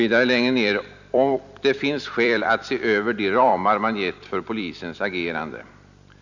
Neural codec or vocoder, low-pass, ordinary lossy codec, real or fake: vocoder, 44.1 kHz, 128 mel bands every 256 samples, BigVGAN v2; 7.2 kHz; none; fake